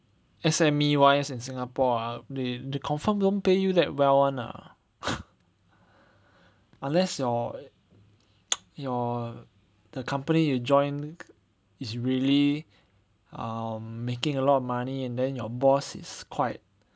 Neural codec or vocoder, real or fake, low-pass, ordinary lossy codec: none; real; none; none